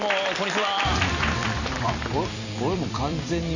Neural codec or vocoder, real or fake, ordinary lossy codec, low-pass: vocoder, 44.1 kHz, 128 mel bands every 256 samples, BigVGAN v2; fake; none; 7.2 kHz